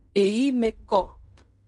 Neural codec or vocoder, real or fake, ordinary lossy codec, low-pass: codec, 16 kHz in and 24 kHz out, 0.4 kbps, LongCat-Audio-Codec, fine tuned four codebook decoder; fake; MP3, 64 kbps; 10.8 kHz